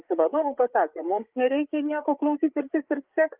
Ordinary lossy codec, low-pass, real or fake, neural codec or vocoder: Opus, 32 kbps; 3.6 kHz; fake; codec, 16 kHz, 8 kbps, FreqCodec, larger model